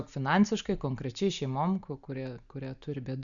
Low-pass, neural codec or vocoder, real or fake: 7.2 kHz; none; real